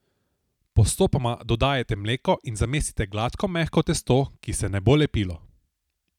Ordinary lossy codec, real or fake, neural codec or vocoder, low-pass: none; real; none; 19.8 kHz